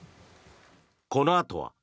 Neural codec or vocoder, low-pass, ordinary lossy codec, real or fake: none; none; none; real